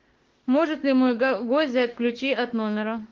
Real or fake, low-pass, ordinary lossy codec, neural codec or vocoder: fake; 7.2 kHz; Opus, 16 kbps; autoencoder, 48 kHz, 32 numbers a frame, DAC-VAE, trained on Japanese speech